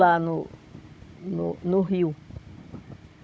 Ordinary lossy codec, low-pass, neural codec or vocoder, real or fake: none; none; codec, 16 kHz, 16 kbps, FunCodec, trained on Chinese and English, 50 frames a second; fake